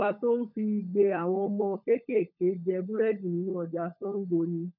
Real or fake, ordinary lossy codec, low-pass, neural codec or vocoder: fake; none; 5.4 kHz; codec, 16 kHz, 16 kbps, FunCodec, trained on LibriTTS, 50 frames a second